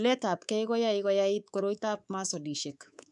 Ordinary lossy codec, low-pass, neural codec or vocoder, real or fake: none; 10.8 kHz; codec, 24 kHz, 3.1 kbps, DualCodec; fake